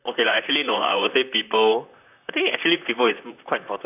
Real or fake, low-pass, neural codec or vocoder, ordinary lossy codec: fake; 3.6 kHz; vocoder, 44.1 kHz, 128 mel bands, Pupu-Vocoder; none